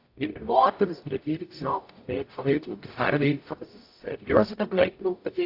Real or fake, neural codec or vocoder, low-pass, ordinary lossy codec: fake; codec, 44.1 kHz, 0.9 kbps, DAC; 5.4 kHz; AAC, 32 kbps